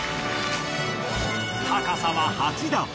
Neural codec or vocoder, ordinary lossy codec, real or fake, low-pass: none; none; real; none